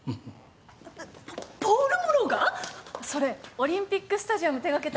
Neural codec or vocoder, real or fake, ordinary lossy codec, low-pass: none; real; none; none